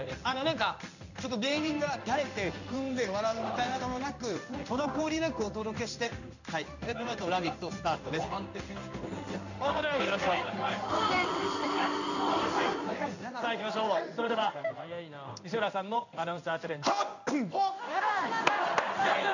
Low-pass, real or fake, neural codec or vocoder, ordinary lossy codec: 7.2 kHz; fake; codec, 16 kHz in and 24 kHz out, 1 kbps, XY-Tokenizer; none